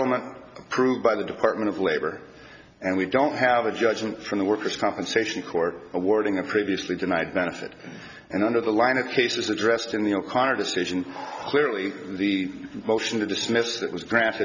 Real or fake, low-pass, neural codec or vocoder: real; 7.2 kHz; none